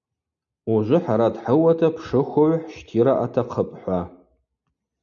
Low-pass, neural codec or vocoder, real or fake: 7.2 kHz; none; real